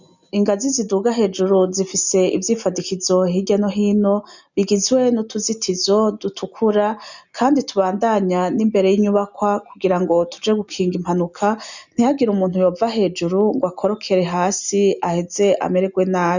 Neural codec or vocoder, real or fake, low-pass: none; real; 7.2 kHz